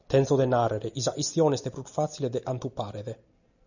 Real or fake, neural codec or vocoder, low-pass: real; none; 7.2 kHz